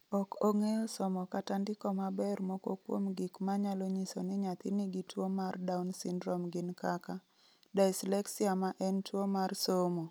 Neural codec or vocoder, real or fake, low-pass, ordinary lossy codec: none; real; none; none